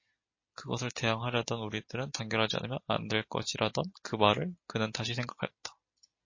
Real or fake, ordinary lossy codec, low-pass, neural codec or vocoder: real; MP3, 32 kbps; 7.2 kHz; none